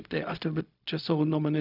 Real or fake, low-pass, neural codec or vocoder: fake; 5.4 kHz; codec, 16 kHz, 0.4 kbps, LongCat-Audio-Codec